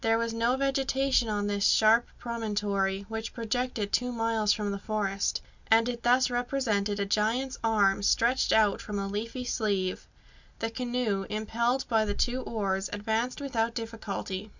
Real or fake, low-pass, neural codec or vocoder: real; 7.2 kHz; none